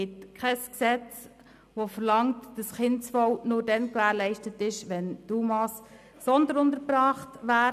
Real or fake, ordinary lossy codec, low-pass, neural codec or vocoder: real; none; 14.4 kHz; none